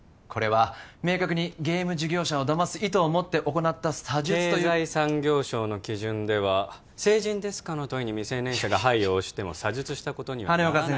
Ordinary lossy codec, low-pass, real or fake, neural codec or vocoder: none; none; real; none